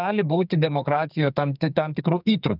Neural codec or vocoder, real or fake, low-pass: codec, 44.1 kHz, 2.6 kbps, SNAC; fake; 5.4 kHz